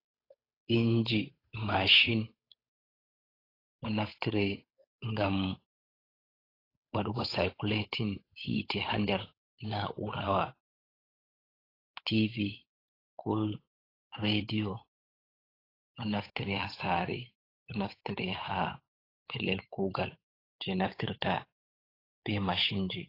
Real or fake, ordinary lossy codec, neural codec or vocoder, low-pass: fake; AAC, 24 kbps; codec, 16 kHz, 8 kbps, FunCodec, trained on Chinese and English, 25 frames a second; 5.4 kHz